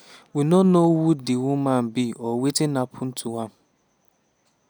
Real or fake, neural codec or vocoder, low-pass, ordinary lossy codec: real; none; none; none